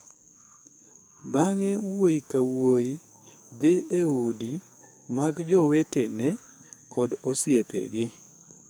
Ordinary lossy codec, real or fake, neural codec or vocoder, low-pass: none; fake; codec, 44.1 kHz, 2.6 kbps, SNAC; none